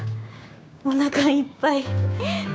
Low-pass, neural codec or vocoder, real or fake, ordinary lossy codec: none; codec, 16 kHz, 6 kbps, DAC; fake; none